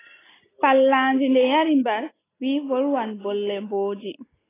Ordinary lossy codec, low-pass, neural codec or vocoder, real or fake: AAC, 16 kbps; 3.6 kHz; none; real